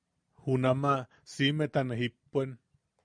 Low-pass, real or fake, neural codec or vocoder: 9.9 kHz; real; none